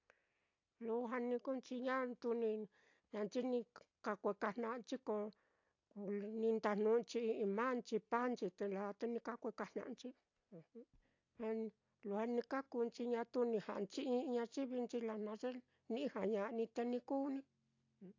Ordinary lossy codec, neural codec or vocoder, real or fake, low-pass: AAC, 48 kbps; none; real; 7.2 kHz